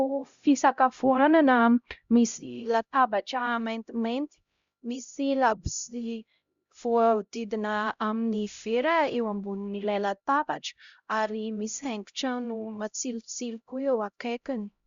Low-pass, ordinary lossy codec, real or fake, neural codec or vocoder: 7.2 kHz; Opus, 64 kbps; fake; codec, 16 kHz, 0.5 kbps, X-Codec, HuBERT features, trained on LibriSpeech